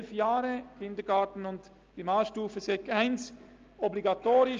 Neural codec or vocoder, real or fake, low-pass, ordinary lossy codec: none; real; 7.2 kHz; Opus, 24 kbps